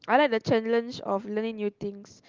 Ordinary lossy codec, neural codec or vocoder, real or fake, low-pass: Opus, 32 kbps; none; real; 7.2 kHz